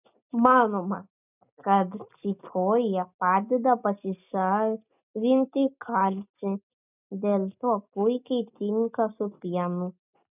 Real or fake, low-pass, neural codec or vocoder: real; 3.6 kHz; none